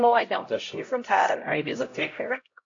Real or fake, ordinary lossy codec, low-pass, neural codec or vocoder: fake; AAC, 48 kbps; 7.2 kHz; codec, 16 kHz, 0.5 kbps, X-Codec, HuBERT features, trained on LibriSpeech